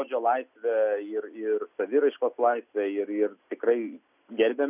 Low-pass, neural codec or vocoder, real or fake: 3.6 kHz; none; real